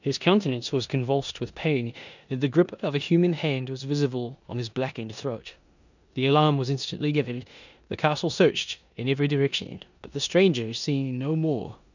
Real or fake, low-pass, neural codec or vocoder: fake; 7.2 kHz; codec, 16 kHz in and 24 kHz out, 0.9 kbps, LongCat-Audio-Codec, four codebook decoder